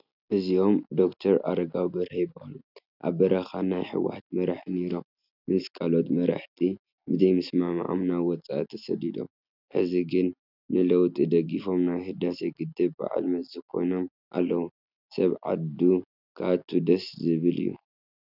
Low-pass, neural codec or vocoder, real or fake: 5.4 kHz; none; real